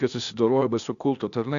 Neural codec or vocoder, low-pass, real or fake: codec, 16 kHz, 0.8 kbps, ZipCodec; 7.2 kHz; fake